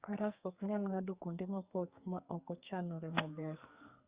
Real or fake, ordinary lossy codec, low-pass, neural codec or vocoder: fake; Opus, 64 kbps; 3.6 kHz; codec, 44.1 kHz, 2.6 kbps, SNAC